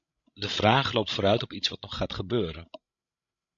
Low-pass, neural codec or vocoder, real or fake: 7.2 kHz; codec, 16 kHz, 16 kbps, FreqCodec, larger model; fake